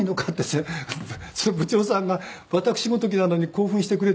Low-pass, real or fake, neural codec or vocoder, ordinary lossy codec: none; real; none; none